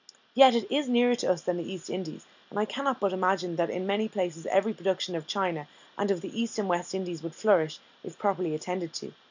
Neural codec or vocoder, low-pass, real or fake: none; 7.2 kHz; real